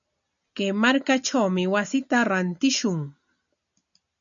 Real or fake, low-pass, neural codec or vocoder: real; 7.2 kHz; none